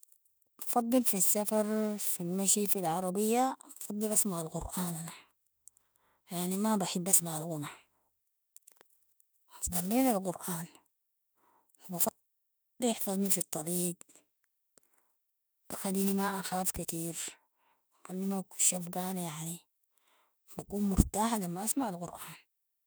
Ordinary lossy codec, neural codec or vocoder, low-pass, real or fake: none; autoencoder, 48 kHz, 32 numbers a frame, DAC-VAE, trained on Japanese speech; none; fake